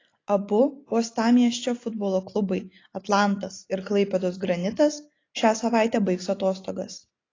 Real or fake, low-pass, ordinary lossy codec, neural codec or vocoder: real; 7.2 kHz; AAC, 32 kbps; none